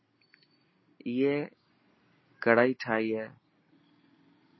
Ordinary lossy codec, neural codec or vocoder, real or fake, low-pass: MP3, 24 kbps; none; real; 7.2 kHz